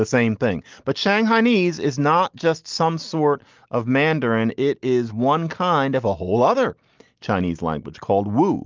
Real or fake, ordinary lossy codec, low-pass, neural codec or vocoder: real; Opus, 32 kbps; 7.2 kHz; none